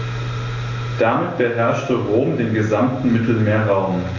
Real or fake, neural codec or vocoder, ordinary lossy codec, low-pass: real; none; AAC, 48 kbps; 7.2 kHz